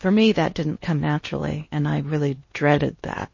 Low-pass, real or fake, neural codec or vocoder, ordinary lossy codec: 7.2 kHz; fake; codec, 16 kHz in and 24 kHz out, 0.8 kbps, FocalCodec, streaming, 65536 codes; MP3, 32 kbps